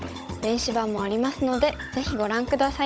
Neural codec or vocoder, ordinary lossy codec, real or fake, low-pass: codec, 16 kHz, 16 kbps, FunCodec, trained on Chinese and English, 50 frames a second; none; fake; none